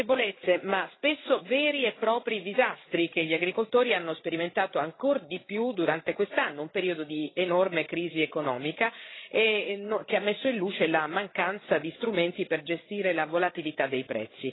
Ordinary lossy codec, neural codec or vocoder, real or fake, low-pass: AAC, 16 kbps; codec, 16 kHz, 8 kbps, FreqCodec, larger model; fake; 7.2 kHz